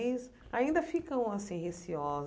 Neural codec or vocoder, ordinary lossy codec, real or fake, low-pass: none; none; real; none